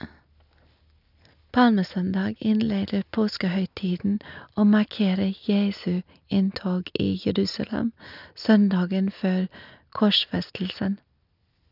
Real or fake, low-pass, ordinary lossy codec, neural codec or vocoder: real; 5.4 kHz; none; none